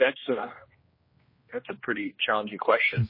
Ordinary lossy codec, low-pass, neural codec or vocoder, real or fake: MP3, 24 kbps; 5.4 kHz; codec, 16 kHz, 1 kbps, X-Codec, HuBERT features, trained on general audio; fake